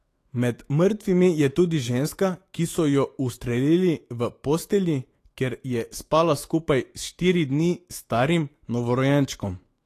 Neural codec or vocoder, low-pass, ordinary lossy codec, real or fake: autoencoder, 48 kHz, 128 numbers a frame, DAC-VAE, trained on Japanese speech; 14.4 kHz; AAC, 48 kbps; fake